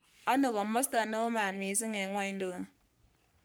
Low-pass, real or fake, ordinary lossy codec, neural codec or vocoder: none; fake; none; codec, 44.1 kHz, 3.4 kbps, Pupu-Codec